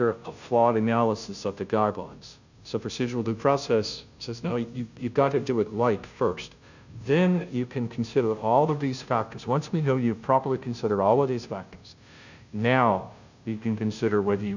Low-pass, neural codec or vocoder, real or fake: 7.2 kHz; codec, 16 kHz, 0.5 kbps, FunCodec, trained on Chinese and English, 25 frames a second; fake